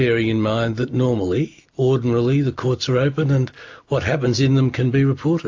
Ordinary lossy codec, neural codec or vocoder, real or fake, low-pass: AAC, 48 kbps; none; real; 7.2 kHz